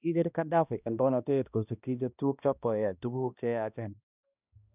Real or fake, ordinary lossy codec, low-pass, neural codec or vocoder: fake; none; 3.6 kHz; codec, 16 kHz, 1 kbps, X-Codec, HuBERT features, trained on balanced general audio